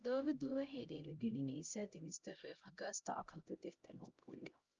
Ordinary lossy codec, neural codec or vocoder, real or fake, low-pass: Opus, 24 kbps; codec, 16 kHz, 0.5 kbps, X-Codec, HuBERT features, trained on LibriSpeech; fake; 7.2 kHz